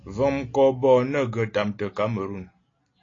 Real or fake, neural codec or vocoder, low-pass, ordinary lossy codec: real; none; 7.2 kHz; AAC, 32 kbps